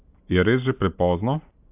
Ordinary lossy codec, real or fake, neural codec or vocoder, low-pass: AAC, 32 kbps; real; none; 3.6 kHz